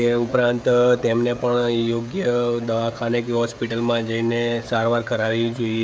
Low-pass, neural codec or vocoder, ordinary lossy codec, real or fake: none; codec, 16 kHz, 16 kbps, FreqCodec, smaller model; none; fake